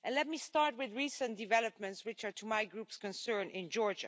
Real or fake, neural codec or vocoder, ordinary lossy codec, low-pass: real; none; none; none